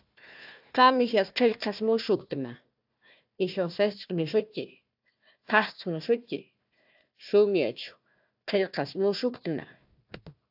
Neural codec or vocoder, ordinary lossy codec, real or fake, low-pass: codec, 16 kHz, 1 kbps, FunCodec, trained on Chinese and English, 50 frames a second; AAC, 48 kbps; fake; 5.4 kHz